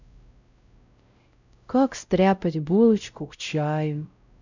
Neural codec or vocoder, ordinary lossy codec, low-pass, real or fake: codec, 16 kHz, 0.5 kbps, X-Codec, WavLM features, trained on Multilingual LibriSpeech; none; 7.2 kHz; fake